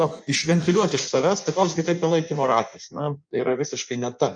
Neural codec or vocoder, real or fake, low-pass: codec, 16 kHz in and 24 kHz out, 1.1 kbps, FireRedTTS-2 codec; fake; 9.9 kHz